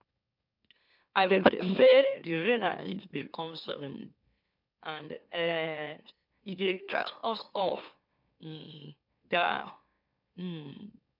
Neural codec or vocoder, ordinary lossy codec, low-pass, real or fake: autoencoder, 44.1 kHz, a latent of 192 numbers a frame, MeloTTS; MP3, 48 kbps; 5.4 kHz; fake